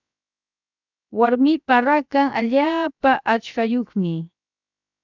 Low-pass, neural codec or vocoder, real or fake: 7.2 kHz; codec, 16 kHz, 0.7 kbps, FocalCodec; fake